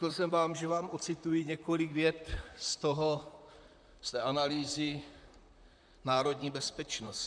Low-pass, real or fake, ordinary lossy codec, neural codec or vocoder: 9.9 kHz; fake; MP3, 96 kbps; vocoder, 44.1 kHz, 128 mel bands, Pupu-Vocoder